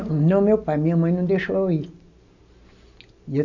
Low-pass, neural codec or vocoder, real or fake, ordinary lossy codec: 7.2 kHz; none; real; none